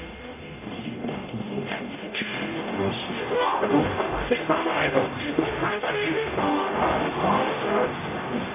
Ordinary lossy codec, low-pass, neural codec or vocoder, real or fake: none; 3.6 kHz; codec, 44.1 kHz, 0.9 kbps, DAC; fake